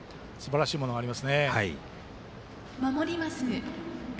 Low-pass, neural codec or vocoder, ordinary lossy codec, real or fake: none; none; none; real